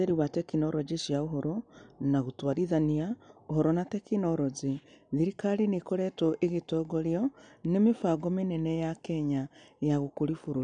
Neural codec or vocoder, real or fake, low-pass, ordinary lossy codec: none; real; 9.9 kHz; none